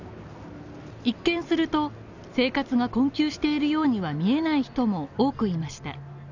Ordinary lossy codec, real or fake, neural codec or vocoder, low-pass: none; real; none; 7.2 kHz